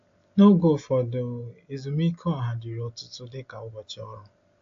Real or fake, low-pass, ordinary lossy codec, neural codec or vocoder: real; 7.2 kHz; AAC, 48 kbps; none